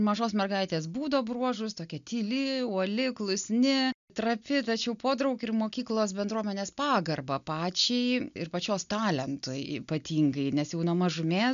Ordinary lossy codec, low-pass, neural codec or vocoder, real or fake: MP3, 96 kbps; 7.2 kHz; none; real